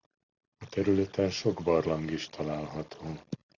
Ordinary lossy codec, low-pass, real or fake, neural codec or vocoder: Opus, 64 kbps; 7.2 kHz; real; none